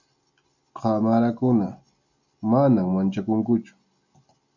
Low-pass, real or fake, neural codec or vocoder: 7.2 kHz; real; none